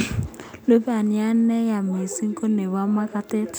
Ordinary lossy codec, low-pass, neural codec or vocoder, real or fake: none; none; none; real